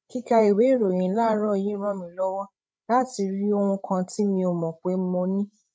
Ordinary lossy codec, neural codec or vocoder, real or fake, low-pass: none; codec, 16 kHz, 16 kbps, FreqCodec, larger model; fake; none